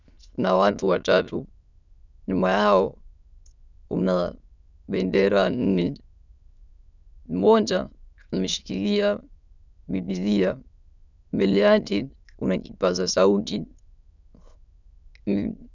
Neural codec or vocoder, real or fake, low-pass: autoencoder, 22.05 kHz, a latent of 192 numbers a frame, VITS, trained on many speakers; fake; 7.2 kHz